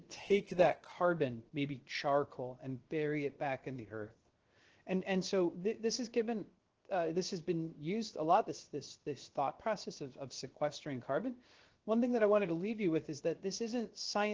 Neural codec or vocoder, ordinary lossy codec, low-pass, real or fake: codec, 16 kHz, 0.7 kbps, FocalCodec; Opus, 16 kbps; 7.2 kHz; fake